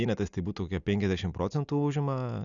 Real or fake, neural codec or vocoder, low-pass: real; none; 7.2 kHz